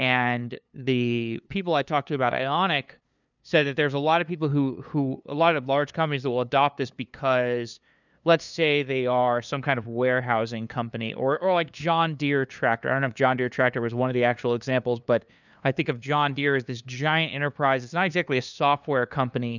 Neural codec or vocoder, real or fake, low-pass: codec, 16 kHz, 2 kbps, FunCodec, trained on LibriTTS, 25 frames a second; fake; 7.2 kHz